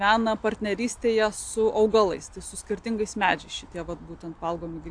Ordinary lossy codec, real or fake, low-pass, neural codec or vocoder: AAC, 64 kbps; real; 9.9 kHz; none